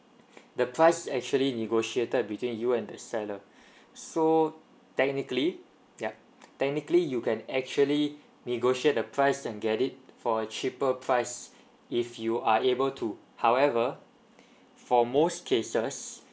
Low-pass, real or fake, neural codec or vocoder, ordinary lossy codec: none; real; none; none